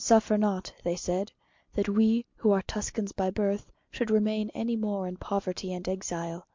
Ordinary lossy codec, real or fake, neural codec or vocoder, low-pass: MP3, 64 kbps; real; none; 7.2 kHz